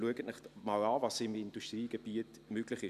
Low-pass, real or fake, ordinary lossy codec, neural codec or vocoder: 14.4 kHz; real; none; none